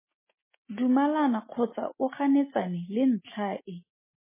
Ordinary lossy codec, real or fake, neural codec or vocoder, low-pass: MP3, 16 kbps; real; none; 3.6 kHz